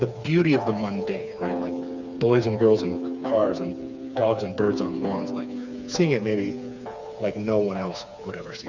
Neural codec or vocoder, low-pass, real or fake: codec, 16 kHz, 4 kbps, FreqCodec, smaller model; 7.2 kHz; fake